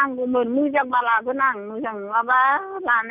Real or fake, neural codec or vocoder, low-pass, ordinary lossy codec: real; none; 3.6 kHz; none